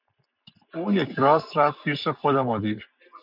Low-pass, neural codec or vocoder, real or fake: 5.4 kHz; none; real